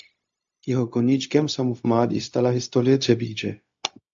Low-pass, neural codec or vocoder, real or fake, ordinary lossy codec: 7.2 kHz; codec, 16 kHz, 0.4 kbps, LongCat-Audio-Codec; fake; AAC, 64 kbps